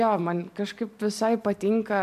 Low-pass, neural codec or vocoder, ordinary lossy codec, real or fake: 14.4 kHz; vocoder, 44.1 kHz, 128 mel bands every 256 samples, BigVGAN v2; MP3, 96 kbps; fake